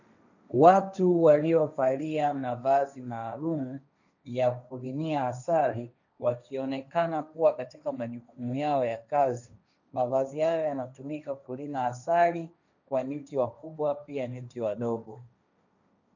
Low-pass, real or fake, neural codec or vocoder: 7.2 kHz; fake; codec, 16 kHz, 1.1 kbps, Voila-Tokenizer